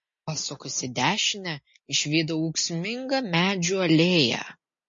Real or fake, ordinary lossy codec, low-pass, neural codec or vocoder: real; MP3, 32 kbps; 7.2 kHz; none